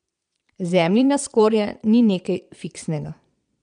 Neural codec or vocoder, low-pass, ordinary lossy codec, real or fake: vocoder, 22.05 kHz, 80 mel bands, Vocos; 9.9 kHz; none; fake